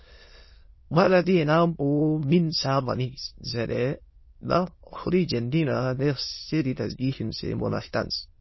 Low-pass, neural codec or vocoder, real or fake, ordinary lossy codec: 7.2 kHz; autoencoder, 22.05 kHz, a latent of 192 numbers a frame, VITS, trained on many speakers; fake; MP3, 24 kbps